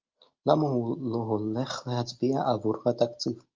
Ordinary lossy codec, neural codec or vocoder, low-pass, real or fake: Opus, 24 kbps; none; 7.2 kHz; real